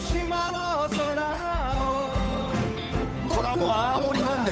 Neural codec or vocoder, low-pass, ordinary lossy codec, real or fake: codec, 16 kHz, 8 kbps, FunCodec, trained on Chinese and English, 25 frames a second; none; none; fake